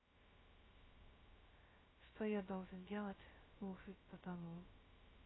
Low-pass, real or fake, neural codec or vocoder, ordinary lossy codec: 7.2 kHz; fake; codec, 16 kHz, 0.2 kbps, FocalCodec; AAC, 16 kbps